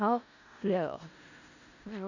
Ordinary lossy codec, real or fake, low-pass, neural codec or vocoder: none; fake; 7.2 kHz; codec, 16 kHz in and 24 kHz out, 0.4 kbps, LongCat-Audio-Codec, four codebook decoder